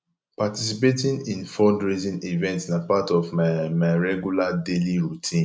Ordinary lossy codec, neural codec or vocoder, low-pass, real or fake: none; none; none; real